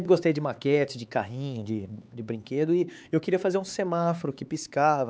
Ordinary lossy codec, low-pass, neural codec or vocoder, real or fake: none; none; codec, 16 kHz, 4 kbps, X-Codec, HuBERT features, trained on LibriSpeech; fake